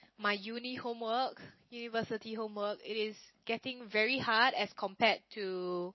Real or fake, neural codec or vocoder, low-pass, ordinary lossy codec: real; none; 7.2 kHz; MP3, 24 kbps